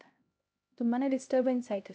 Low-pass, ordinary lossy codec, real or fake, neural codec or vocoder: none; none; fake; codec, 16 kHz, 1 kbps, X-Codec, HuBERT features, trained on LibriSpeech